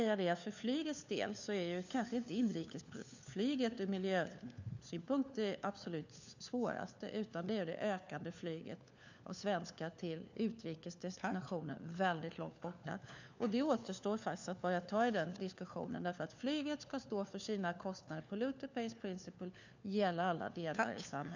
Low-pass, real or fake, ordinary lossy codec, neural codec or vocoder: 7.2 kHz; fake; none; codec, 16 kHz, 4 kbps, FunCodec, trained on LibriTTS, 50 frames a second